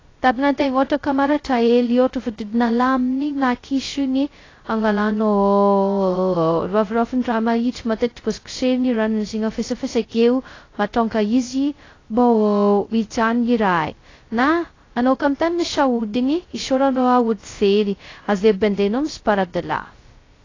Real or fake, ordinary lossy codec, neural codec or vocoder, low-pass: fake; AAC, 32 kbps; codec, 16 kHz, 0.2 kbps, FocalCodec; 7.2 kHz